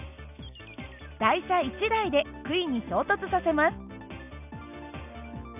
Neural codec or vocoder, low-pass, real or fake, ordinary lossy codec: none; 3.6 kHz; real; none